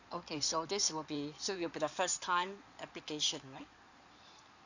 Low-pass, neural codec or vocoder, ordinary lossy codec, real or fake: 7.2 kHz; codec, 16 kHz in and 24 kHz out, 2.2 kbps, FireRedTTS-2 codec; none; fake